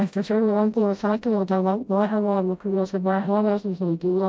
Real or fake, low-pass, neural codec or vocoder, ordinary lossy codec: fake; none; codec, 16 kHz, 0.5 kbps, FreqCodec, smaller model; none